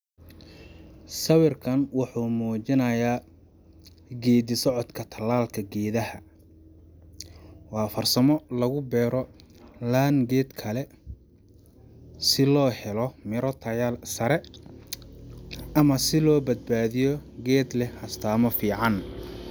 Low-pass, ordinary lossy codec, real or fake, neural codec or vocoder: none; none; real; none